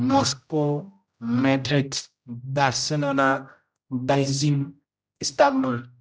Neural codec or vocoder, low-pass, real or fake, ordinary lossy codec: codec, 16 kHz, 0.5 kbps, X-Codec, HuBERT features, trained on general audio; none; fake; none